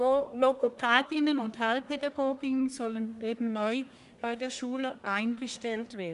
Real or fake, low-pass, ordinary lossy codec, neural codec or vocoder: fake; 10.8 kHz; none; codec, 24 kHz, 1 kbps, SNAC